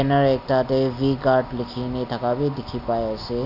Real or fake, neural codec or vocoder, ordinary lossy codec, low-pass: real; none; none; 5.4 kHz